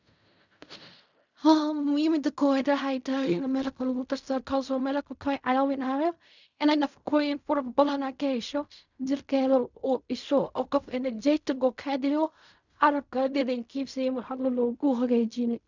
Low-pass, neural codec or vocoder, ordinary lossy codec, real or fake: 7.2 kHz; codec, 16 kHz in and 24 kHz out, 0.4 kbps, LongCat-Audio-Codec, fine tuned four codebook decoder; none; fake